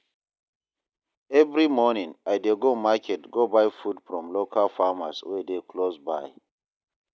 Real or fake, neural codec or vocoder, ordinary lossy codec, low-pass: real; none; none; none